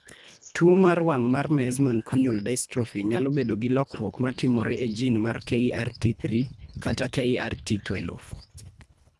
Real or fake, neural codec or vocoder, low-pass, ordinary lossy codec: fake; codec, 24 kHz, 1.5 kbps, HILCodec; none; none